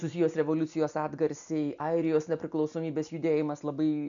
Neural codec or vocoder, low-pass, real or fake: none; 7.2 kHz; real